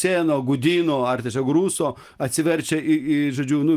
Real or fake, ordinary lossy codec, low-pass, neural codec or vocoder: real; Opus, 32 kbps; 14.4 kHz; none